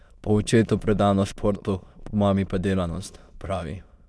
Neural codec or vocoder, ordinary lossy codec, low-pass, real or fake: autoencoder, 22.05 kHz, a latent of 192 numbers a frame, VITS, trained on many speakers; none; none; fake